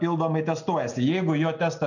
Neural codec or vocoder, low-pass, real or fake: none; 7.2 kHz; real